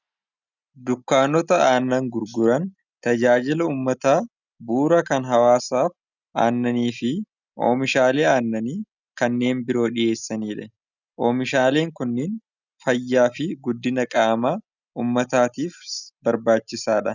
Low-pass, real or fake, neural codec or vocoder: 7.2 kHz; real; none